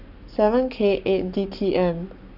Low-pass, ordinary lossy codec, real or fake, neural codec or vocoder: 5.4 kHz; none; fake; codec, 44.1 kHz, 7.8 kbps, Pupu-Codec